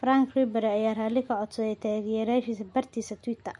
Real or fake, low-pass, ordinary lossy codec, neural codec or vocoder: real; 10.8 kHz; MP3, 48 kbps; none